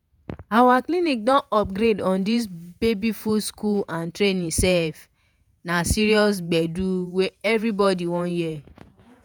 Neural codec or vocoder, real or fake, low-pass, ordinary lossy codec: vocoder, 48 kHz, 128 mel bands, Vocos; fake; none; none